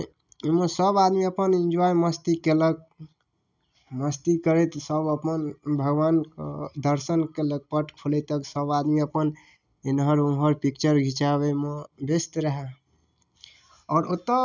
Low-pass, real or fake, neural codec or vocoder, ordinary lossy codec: 7.2 kHz; real; none; none